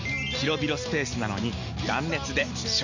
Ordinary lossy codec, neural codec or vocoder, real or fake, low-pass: none; none; real; 7.2 kHz